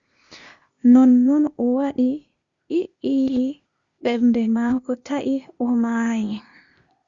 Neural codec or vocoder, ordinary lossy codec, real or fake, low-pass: codec, 16 kHz, 0.8 kbps, ZipCodec; Opus, 64 kbps; fake; 7.2 kHz